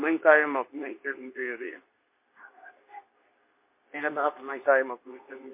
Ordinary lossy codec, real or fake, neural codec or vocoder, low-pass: MP3, 24 kbps; fake; codec, 24 kHz, 0.9 kbps, WavTokenizer, medium speech release version 2; 3.6 kHz